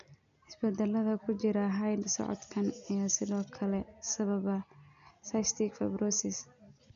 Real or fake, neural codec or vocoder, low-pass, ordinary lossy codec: real; none; 7.2 kHz; none